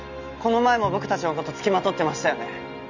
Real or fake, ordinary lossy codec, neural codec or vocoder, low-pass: real; none; none; 7.2 kHz